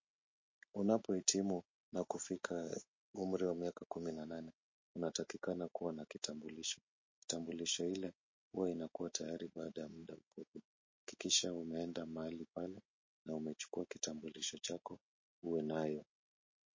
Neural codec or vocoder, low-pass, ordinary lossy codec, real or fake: none; 7.2 kHz; MP3, 32 kbps; real